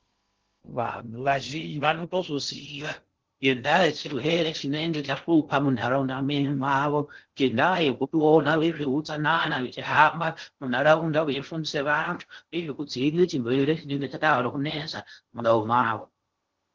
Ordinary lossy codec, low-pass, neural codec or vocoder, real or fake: Opus, 16 kbps; 7.2 kHz; codec, 16 kHz in and 24 kHz out, 0.6 kbps, FocalCodec, streaming, 2048 codes; fake